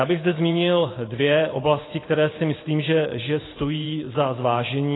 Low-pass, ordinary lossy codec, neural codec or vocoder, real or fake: 7.2 kHz; AAC, 16 kbps; vocoder, 44.1 kHz, 128 mel bands every 512 samples, BigVGAN v2; fake